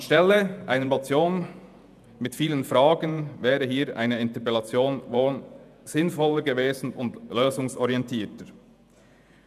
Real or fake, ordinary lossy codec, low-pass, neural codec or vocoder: fake; none; 14.4 kHz; vocoder, 48 kHz, 128 mel bands, Vocos